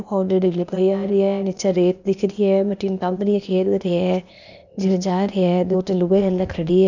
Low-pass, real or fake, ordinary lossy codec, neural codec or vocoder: 7.2 kHz; fake; none; codec, 16 kHz, 0.8 kbps, ZipCodec